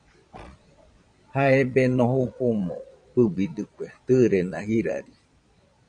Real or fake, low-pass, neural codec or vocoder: fake; 9.9 kHz; vocoder, 22.05 kHz, 80 mel bands, Vocos